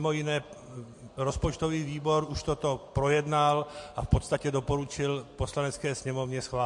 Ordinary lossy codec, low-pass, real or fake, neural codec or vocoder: MP3, 48 kbps; 10.8 kHz; real; none